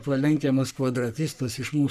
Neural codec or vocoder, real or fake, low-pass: codec, 44.1 kHz, 3.4 kbps, Pupu-Codec; fake; 14.4 kHz